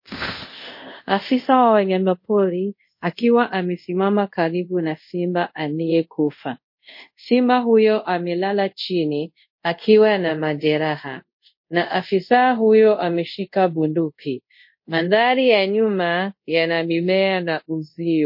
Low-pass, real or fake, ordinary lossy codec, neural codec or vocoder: 5.4 kHz; fake; MP3, 32 kbps; codec, 24 kHz, 0.5 kbps, DualCodec